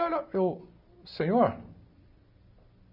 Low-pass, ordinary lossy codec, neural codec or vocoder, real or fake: 5.4 kHz; none; none; real